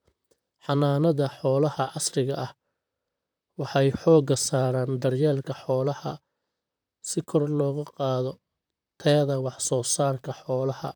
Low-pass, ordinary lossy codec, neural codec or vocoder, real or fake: none; none; vocoder, 44.1 kHz, 128 mel bands, Pupu-Vocoder; fake